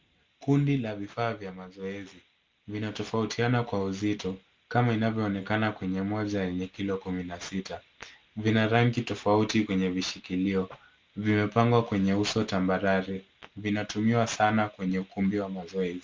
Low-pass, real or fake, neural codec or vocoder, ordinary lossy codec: 7.2 kHz; real; none; Opus, 32 kbps